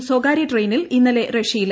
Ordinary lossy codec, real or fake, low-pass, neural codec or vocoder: none; real; none; none